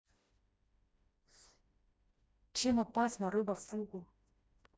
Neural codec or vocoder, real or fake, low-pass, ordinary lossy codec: codec, 16 kHz, 1 kbps, FreqCodec, smaller model; fake; none; none